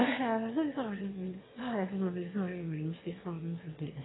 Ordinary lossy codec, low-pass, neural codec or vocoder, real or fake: AAC, 16 kbps; 7.2 kHz; autoencoder, 22.05 kHz, a latent of 192 numbers a frame, VITS, trained on one speaker; fake